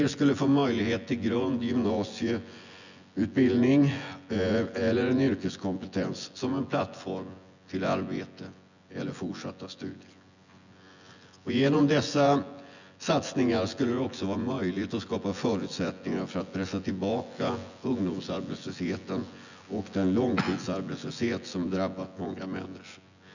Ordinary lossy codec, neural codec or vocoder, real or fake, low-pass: none; vocoder, 24 kHz, 100 mel bands, Vocos; fake; 7.2 kHz